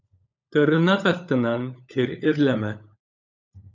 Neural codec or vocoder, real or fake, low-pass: codec, 16 kHz, 16 kbps, FunCodec, trained on LibriTTS, 50 frames a second; fake; 7.2 kHz